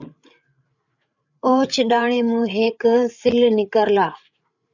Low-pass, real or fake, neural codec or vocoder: 7.2 kHz; fake; codec, 16 kHz, 8 kbps, FreqCodec, larger model